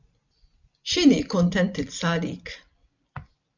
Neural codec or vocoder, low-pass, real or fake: vocoder, 44.1 kHz, 128 mel bands every 256 samples, BigVGAN v2; 7.2 kHz; fake